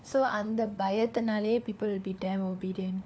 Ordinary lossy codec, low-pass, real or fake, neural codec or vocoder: none; none; fake; codec, 16 kHz, 4 kbps, FunCodec, trained on LibriTTS, 50 frames a second